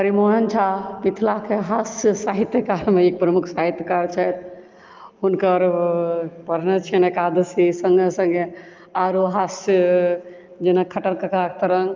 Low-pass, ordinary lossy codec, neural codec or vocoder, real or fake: 7.2 kHz; Opus, 24 kbps; none; real